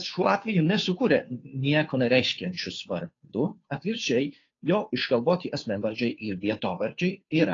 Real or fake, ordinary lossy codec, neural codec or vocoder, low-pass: fake; AAC, 32 kbps; codec, 16 kHz, 2 kbps, FunCodec, trained on Chinese and English, 25 frames a second; 7.2 kHz